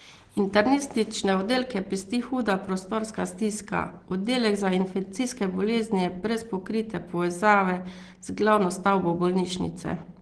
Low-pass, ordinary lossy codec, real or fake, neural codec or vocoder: 9.9 kHz; Opus, 16 kbps; real; none